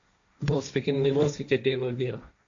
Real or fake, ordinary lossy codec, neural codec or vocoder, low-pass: fake; AAC, 64 kbps; codec, 16 kHz, 1.1 kbps, Voila-Tokenizer; 7.2 kHz